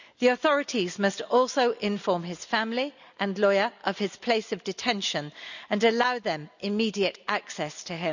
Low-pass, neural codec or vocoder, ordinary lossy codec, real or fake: 7.2 kHz; none; none; real